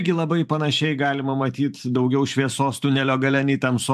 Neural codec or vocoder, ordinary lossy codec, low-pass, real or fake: vocoder, 44.1 kHz, 128 mel bands every 512 samples, BigVGAN v2; AAC, 96 kbps; 14.4 kHz; fake